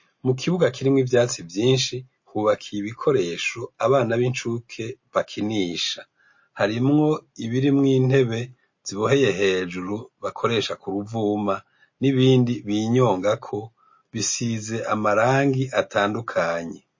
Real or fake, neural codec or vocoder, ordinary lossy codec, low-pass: real; none; MP3, 32 kbps; 7.2 kHz